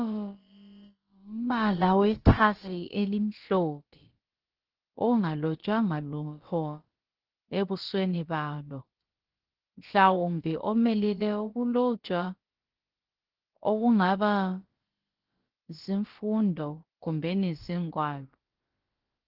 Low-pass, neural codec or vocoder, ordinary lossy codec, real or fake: 5.4 kHz; codec, 16 kHz, about 1 kbps, DyCAST, with the encoder's durations; Opus, 16 kbps; fake